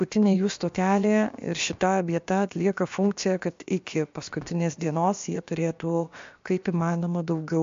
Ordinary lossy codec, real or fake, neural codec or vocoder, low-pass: MP3, 48 kbps; fake; codec, 16 kHz, about 1 kbps, DyCAST, with the encoder's durations; 7.2 kHz